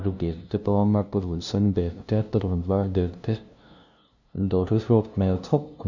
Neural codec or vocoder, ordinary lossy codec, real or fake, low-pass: codec, 16 kHz, 0.5 kbps, FunCodec, trained on LibriTTS, 25 frames a second; none; fake; 7.2 kHz